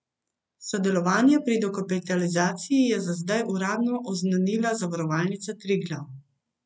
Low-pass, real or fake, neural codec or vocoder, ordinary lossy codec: none; real; none; none